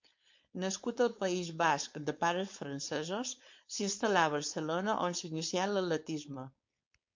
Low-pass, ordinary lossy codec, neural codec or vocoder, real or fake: 7.2 kHz; MP3, 48 kbps; codec, 16 kHz, 4.8 kbps, FACodec; fake